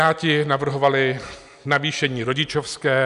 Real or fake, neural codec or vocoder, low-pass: real; none; 10.8 kHz